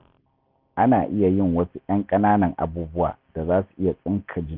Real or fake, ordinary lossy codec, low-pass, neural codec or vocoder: real; none; 5.4 kHz; none